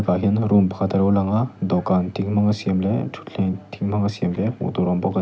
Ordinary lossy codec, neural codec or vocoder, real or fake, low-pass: none; none; real; none